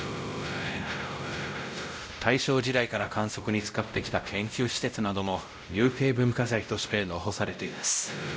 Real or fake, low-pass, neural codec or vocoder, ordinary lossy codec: fake; none; codec, 16 kHz, 0.5 kbps, X-Codec, WavLM features, trained on Multilingual LibriSpeech; none